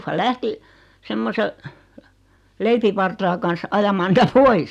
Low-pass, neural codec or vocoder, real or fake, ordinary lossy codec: 14.4 kHz; none; real; none